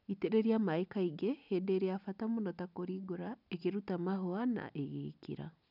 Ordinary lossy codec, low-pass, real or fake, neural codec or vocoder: none; 5.4 kHz; real; none